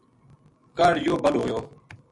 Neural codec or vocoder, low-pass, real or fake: none; 10.8 kHz; real